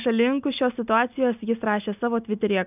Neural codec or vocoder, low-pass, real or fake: none; 3.6 kHz; real